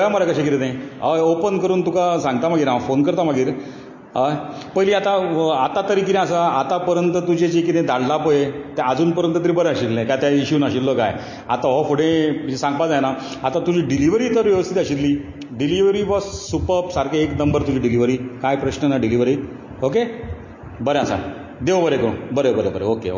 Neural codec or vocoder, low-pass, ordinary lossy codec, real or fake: none; 7.2 kHz; MP3, 32 kbps; real